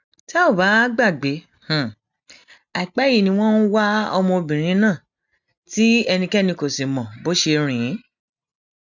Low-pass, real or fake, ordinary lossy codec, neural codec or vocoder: 7.2 kHz; real; none; none